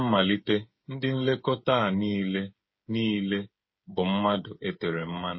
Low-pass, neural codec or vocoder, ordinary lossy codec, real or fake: 7.2 kHz; codec, 16 kHz, 8 kbps, FreqCodec, smaller model; MP3, 24 kbps; fake